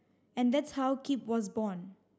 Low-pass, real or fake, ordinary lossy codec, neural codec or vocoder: none; real; none; none